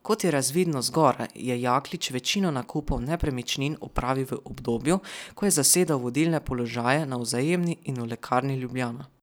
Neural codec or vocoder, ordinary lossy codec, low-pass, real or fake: none; none; none; real